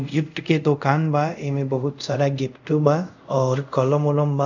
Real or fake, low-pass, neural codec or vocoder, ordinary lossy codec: fake; 7.2 kHz; codec, 24 kHz, 0.5 kbps, DualCodec; none